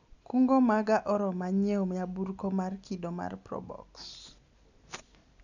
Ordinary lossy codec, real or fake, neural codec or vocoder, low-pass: none; real; none; 7.2 kHz